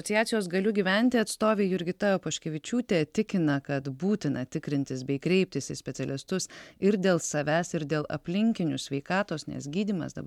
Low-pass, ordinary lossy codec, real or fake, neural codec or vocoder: 19.8 kHz; MP3, 96 kbps; real; none